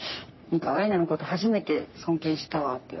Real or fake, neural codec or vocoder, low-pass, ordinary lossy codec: fake; codec, 44.1 kHz, 3.4 kbps, Pupu-Codec; 7.2 kHz; MP3, 24 kbps